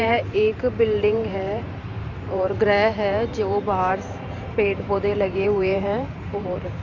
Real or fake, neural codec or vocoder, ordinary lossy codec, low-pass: fake; vocoder, 44.1 kHz, 128 mel bands every 512 samples, BigVGAN v2; none; 7.2 kHz